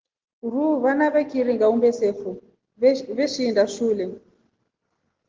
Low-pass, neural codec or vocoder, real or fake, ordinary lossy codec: 7.2 kHz; none; real; Opus, 16 kbps